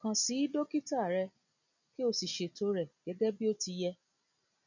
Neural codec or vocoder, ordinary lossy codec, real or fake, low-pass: none; none; real; 7.2 kHz